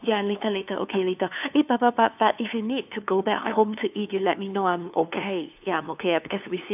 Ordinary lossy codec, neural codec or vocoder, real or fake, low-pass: none; codec, 16 kHz, 2 kbps, FunCodec, trained on LibriTTS, 25 frames a second; fake; 3.6 kHz